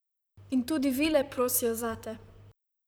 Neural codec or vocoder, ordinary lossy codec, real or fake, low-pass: vocoder, 44.1 kHz, 128 mel bands, Pupu-Vocoder; none; fake; none